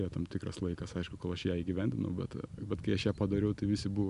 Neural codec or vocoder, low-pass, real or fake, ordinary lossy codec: none; 10.8 kHz; real; AAC, 96 kbps